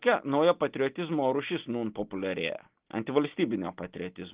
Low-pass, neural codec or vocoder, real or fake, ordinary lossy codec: 3.6 kHz; none; real; Opus, 32 kbps